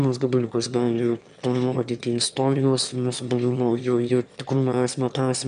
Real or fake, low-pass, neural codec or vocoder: fake; 9.9 kHz; autoencoder, 22.05 kHz, a latent of 192 numbers a frame, VITS, trained on one speaker